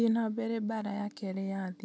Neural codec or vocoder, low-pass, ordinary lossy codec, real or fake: none; none; none; real